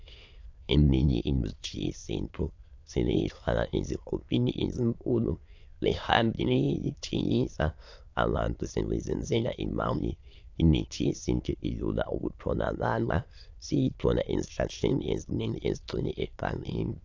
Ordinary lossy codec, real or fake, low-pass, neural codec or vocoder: AAC, 48 kbps; fake; 7.2 kHz; autoencoder, 22.05 kHz, a latent of 192 numbers a frame, VITS, trained on many speakers